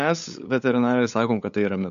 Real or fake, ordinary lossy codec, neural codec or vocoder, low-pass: fake; MP3, 64 kbps; codec, 16 kHz, 8 kbps, FunCodec, trained on LibriTTS, 25 frames a second; 7.2 kHz